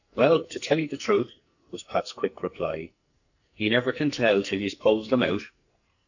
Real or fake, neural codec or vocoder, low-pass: fake; codec, 44.1 kHz, 2.6 kbps, SNAC; 7.2 kHz